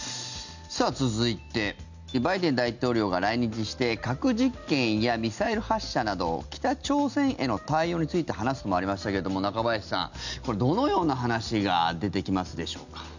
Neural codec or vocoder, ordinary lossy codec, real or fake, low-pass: none; none; real; 7.2 kHz